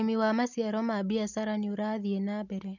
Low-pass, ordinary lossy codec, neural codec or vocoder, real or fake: 7.2 kHz; none; none; real